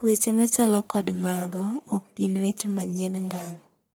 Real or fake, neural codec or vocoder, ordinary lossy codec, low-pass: fake; codec, 44.1 kHz, 1.7 kbps, Pupu-Codec; none; none